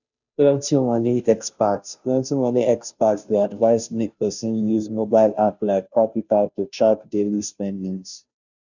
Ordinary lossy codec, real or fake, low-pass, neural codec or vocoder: none; fake; 7.2 kHz; codec, 16 kHz, 0.5 kbps, FunCodec, trained on Chinese and English, 25 frames a second